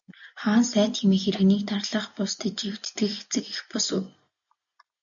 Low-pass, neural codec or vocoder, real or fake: 7.2 kHz; none; real